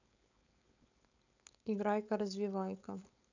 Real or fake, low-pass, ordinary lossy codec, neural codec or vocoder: fake; 7.2 kHz; none; codec, 16 kHz, 4.8 kbps, FACodec